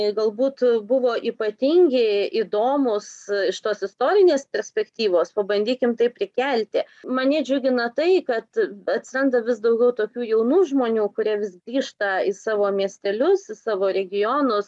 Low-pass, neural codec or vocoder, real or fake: 10.8 kHz; none; real